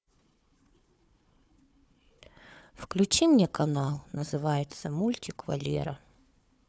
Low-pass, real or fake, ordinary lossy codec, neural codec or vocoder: none; fake; none; codec, 16 kHz, 4 kbps, FunCodec, trained on Chinese and English, 50 frames a second